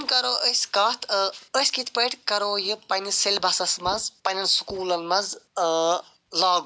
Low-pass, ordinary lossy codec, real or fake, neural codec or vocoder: none; none; real; none